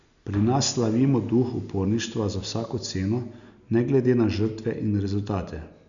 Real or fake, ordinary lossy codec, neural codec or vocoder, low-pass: real; none; none; 7.2 kHz